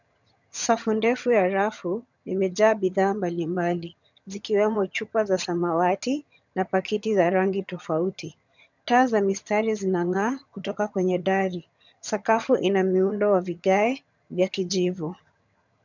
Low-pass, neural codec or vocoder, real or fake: 7.2 kHz; vocoder, 22.05 kHz, 80 mel bands, HiFi-GAN; fake